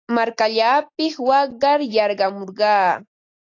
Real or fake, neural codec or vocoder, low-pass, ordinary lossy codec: real; none; 7.2 kHz; AAC, 48 kbps